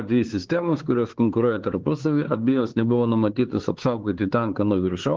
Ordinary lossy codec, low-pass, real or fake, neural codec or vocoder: Opus, 16 kbps; 7.2 kHz; fake; codec, 16 kHz, 4 kbps, X-Codec, HuBERT features, trained on LibriSpeech